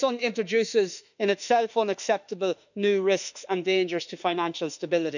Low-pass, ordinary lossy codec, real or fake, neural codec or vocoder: 7.2 kHz; none; fake; autoencoder, 48 kHz, 32 numbers a frame, DAC-VAE, trained on Japanese speech